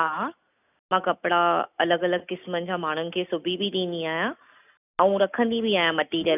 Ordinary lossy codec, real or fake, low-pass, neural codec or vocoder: none; real; 3.6 kHz; none